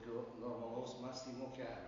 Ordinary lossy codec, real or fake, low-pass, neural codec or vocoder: AAC, 32 kbps; real; 7.2 kHz; none